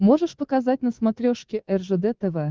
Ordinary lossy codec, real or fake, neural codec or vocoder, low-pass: Opus, 16 kbps; fake; codec, 24 kHz, 3.1 kbps, DualCodec; 7.2 kHz